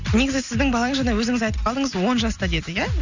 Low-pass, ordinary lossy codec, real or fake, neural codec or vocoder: 7.2 kHz; none; real; none